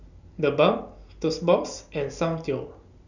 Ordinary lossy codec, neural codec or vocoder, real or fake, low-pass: none; none; real; 7.2 kHz